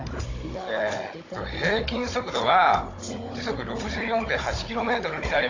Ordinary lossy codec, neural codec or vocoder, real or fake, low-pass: AAC, 32 kbps; codec, 16 kHz, 16 kbps, FunCodec, trained on Chinese and English, 50 frames a second; fake; 7.2 kHz